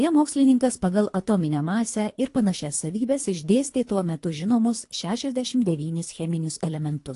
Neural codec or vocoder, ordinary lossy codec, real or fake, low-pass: codec, 24 kHz, 3 kbps, HILCodec; AAC, 48 kbps; fake; 10.8 kHz